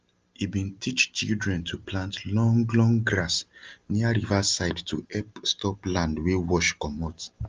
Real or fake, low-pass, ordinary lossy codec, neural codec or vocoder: real; 7.2 kHz; Opus, 24 kbps; none